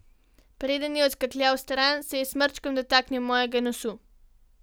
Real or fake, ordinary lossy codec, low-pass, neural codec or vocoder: real; none; none; none